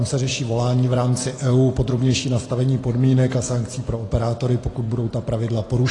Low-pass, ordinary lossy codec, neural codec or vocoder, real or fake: 10.8 kHz; AAC, 32 kbps; none; real